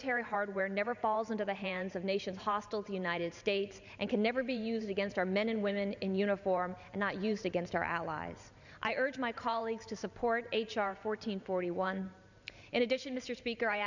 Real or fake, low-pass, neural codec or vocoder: fake; 7.2 kHz; vocoder, 44.1 kHz, 128 mel bands every 512 samples, BigVGAN v2